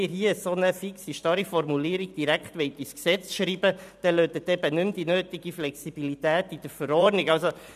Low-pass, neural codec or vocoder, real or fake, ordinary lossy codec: 14.4 kHz; vocoder, 44.1 kHz, 128 mel bands every 512 samples, BigVGAN v2; fake; none